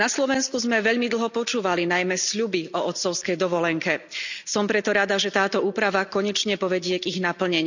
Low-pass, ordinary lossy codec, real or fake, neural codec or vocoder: 7.2 kHz; none; real; none